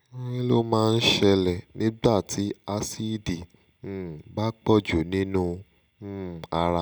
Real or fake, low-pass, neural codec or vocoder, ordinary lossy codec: real; none; none; none